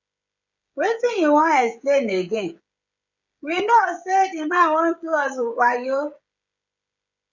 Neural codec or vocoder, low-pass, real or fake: codec, 16 kHz, 16 kbps, FreqCodec, smaller model; 7.2 kHz; fake